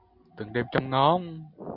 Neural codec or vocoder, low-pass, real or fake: none; 5.4 kHz; real